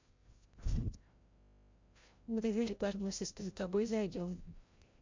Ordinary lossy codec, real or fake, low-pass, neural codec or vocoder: MP3, 48 kbps; fake; 7.2 kHz; codec, 16 kHz, 0.5 kbps, FreqCodec, larger model